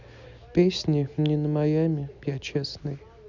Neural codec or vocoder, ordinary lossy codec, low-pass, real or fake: none; none; 7.2 kHz; real